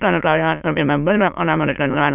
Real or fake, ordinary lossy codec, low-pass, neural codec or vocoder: fake; none; 3.6 kHz; autoencoder, 22.05 kHz, a latent of 192 numbers a frame, VITS, trained on many speakers